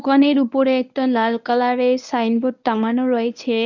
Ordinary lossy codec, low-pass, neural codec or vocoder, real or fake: none; 7.2 kHz; codec, 24 kHz, 0.9 kbps, WavTokenizer, medium speech release version 1; fake